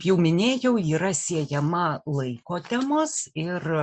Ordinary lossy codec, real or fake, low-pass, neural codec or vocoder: Opus, 64 kbps; real; 9.9 kHz; none